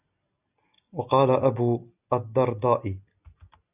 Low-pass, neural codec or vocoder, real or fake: 3.6 kHz; none; real